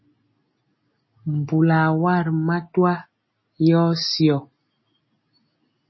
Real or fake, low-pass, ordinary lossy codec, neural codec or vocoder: real; 7.2 kHz; MP3, 24 kbps; none